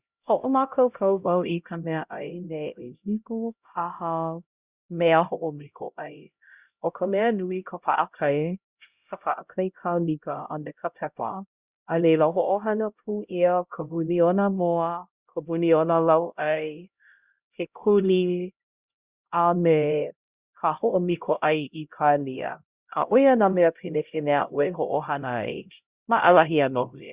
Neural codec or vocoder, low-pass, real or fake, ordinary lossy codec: codec, 16 kHz, 0.5 kbps, X-Codec, HuBERT features, trained on LibriSpeech; 3.6 kHz; fake; Opus, 64 kbps